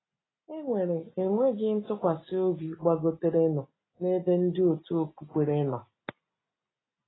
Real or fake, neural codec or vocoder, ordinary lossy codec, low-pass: real; none; AAC, 16 kbps; 7.2 kHz